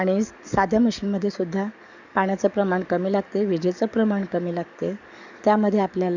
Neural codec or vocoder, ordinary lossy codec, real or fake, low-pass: codec, 44.1 kHz, 7.8 kbps, DAC; none; fake; 7.2 kHz